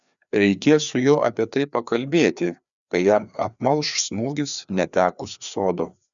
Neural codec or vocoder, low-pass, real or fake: codec, 16 kHz, 2 kbps, FreqCodec, larger model; 7.2 kHz; fake